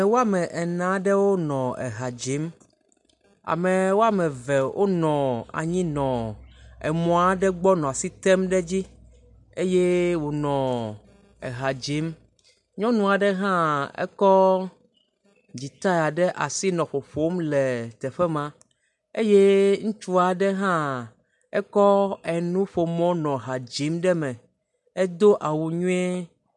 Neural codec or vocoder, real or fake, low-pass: none; real; 10.8 kHz